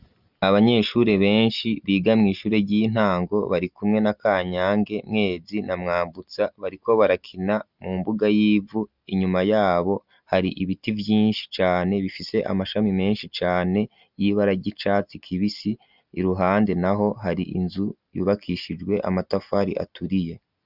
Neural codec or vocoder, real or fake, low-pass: none; real; 5.4 kHz